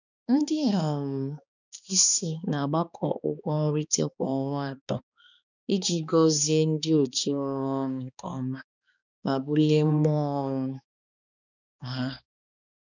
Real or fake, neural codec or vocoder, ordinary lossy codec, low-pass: fake; codec, 16 kHz, 2 kbps, X-Codec, HuBERT features, trained on balanced general audio; none; 7.2 kHz